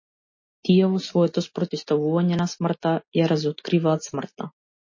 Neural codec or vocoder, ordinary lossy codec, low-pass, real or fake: none; MP3, 32 kbps; 7.2 kHz; real